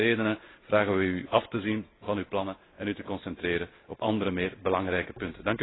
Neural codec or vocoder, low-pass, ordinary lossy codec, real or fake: none; 7.2 kHz; AAC, 16 kbps; real